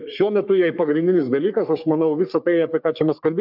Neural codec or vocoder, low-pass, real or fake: codec, 44.1 kHz, 3.4 kbps, Pupu-Codec; 5.4 kHz; fake